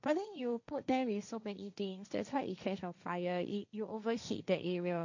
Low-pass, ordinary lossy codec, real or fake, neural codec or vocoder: 7.2 kHz; none; fake; codec, 16 kHz, 1.1 kbps, Voila-Tokenizer